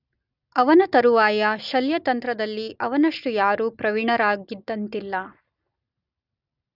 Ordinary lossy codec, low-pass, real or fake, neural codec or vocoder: none; 5.4 kHz; real; none